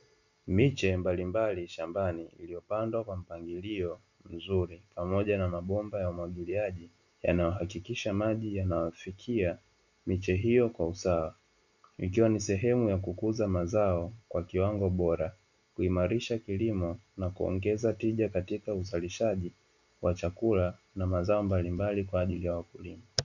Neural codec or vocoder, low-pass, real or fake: none; 7.2 kHz; real